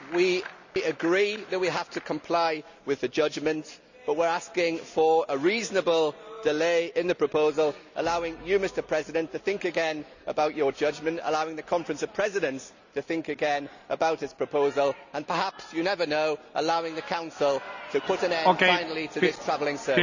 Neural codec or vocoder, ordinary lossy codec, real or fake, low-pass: none; none; real; 7.2 kHz